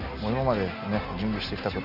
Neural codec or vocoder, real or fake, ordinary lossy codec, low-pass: none; real; Opus, 32 kbps; 5.4 kHz